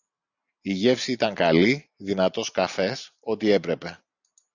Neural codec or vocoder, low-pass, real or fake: none; 7.2 kHz; real